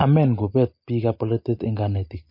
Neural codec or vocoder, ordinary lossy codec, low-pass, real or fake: none; MP3, 32 kbps; 5.4 kHz; real